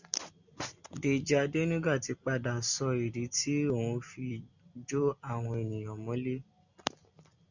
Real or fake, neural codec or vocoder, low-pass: real; none; 7.2 kHz